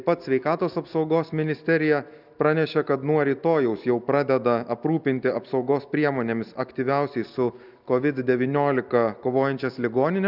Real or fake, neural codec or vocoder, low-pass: real; none; 5.4 kHz